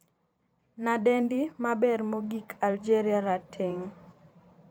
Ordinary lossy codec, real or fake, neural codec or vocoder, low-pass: none; fake; vocoder, 44.1 kHz, 128 mel bands every 512 samples, BigVGAN v2; none